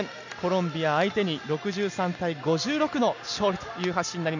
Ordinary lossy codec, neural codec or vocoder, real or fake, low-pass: none; none; real; 7.2 kHz